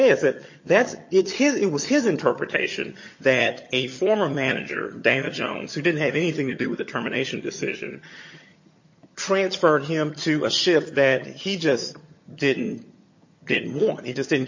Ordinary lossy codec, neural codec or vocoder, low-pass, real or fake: MP3, 32 kbps; vocoder, 22.05 kHz, 80 mel bands, HiFi-GAN; 7.2 kHz; fake